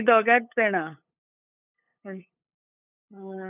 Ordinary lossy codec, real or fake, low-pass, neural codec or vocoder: none; fake; 3.6 kHz; codec, 16 kHz, 8 kbps, FunCodec, trained on LibriTTS, 25 frames a second